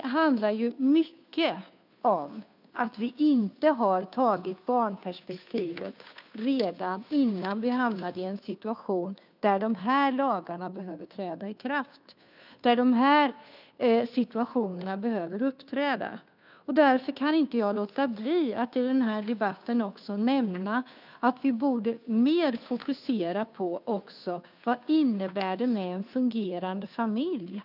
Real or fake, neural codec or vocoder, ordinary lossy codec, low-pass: fake; codec, 16 kHz, 2 kbps, FunCodec, trained on Chinese and English, 25 frames a second; none; 5.4 kHz